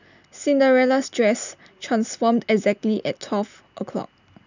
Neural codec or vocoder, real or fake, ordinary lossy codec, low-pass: none; real; none; 7.2 kHz